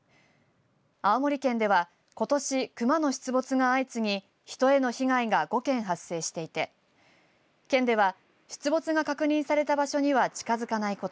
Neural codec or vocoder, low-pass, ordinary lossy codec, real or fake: none; none; none; real